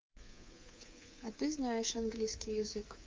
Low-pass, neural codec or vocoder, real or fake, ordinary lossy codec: 7.2 kHz; codec, 24 kHz, 3.1 kbps, DualCodec; fake; Opus, 24 kbps